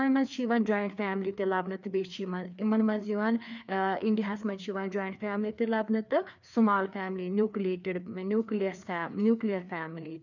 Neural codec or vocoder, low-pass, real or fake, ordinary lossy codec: codec, 16 kHz, 2 kbps, FreqCodec, larger model; 7.2 kHz; fake; none